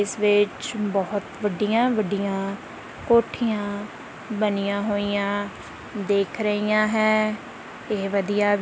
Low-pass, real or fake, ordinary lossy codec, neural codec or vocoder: none; real; none; none